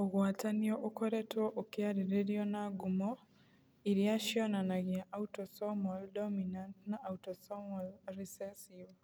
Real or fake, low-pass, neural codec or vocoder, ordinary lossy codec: real; none; none; none